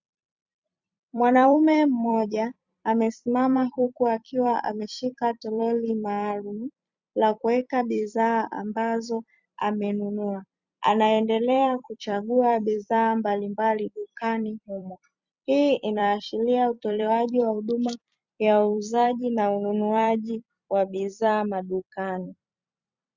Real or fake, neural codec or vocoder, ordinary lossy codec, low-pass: fake; vocoder, 44.1 kHz, 128 mel bands every 256 samples, BigVGAN v2; Opus, 64 kbps; 7.2 kHz